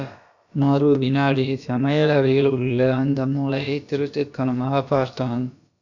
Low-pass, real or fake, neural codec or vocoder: 7.2 kHz; fake; codec, 16 kHz, about 1 kbps, DyCAST, with the encoder's durations